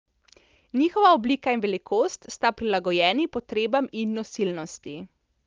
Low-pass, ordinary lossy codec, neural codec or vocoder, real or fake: 7.2 kHz; Opus, 32 kbps; none; real